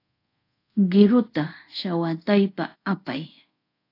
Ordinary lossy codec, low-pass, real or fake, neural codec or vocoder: AAC, 32 kbps; 5.4 kHz; fake; codec, 24 kHz, 0.5 kbps, DualCodec